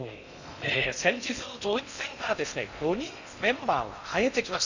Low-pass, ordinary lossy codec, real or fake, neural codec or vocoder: 7.2 kHz; none; fake; codec, 16 kHz in and 24 kHz out, 0.6 kbps, FocalCodec, streaming, 2048 codes